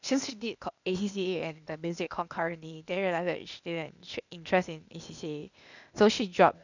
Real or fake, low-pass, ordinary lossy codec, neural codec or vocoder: fake; 7.2 kHz; none; codec, 16 kHz, 0.8 kbps, ZipCodec